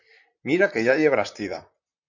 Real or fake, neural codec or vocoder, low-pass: fake; vocoder, 44.1 kHz, 128 mel bands, Pupu-Vocoder; 7.2 kHz